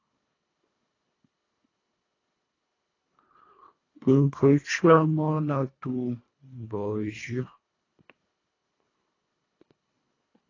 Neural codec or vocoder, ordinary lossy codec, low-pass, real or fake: codec, 24 kHz, 1.5 kbps, HILCodec; AAC, 32 kbps; 7.2 kHz; fake